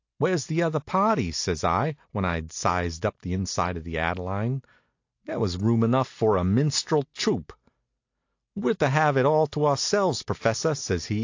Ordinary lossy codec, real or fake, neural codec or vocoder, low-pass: AAC, 48 kbps; real; none; 7.2 kHz